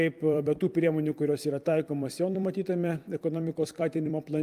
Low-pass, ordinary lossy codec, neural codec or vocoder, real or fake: 14.4 kHz; Opus, 32 kbps; vocoder, 44.1 kHz, 128 mel bands every 256 samples, BigVGAN v2; fake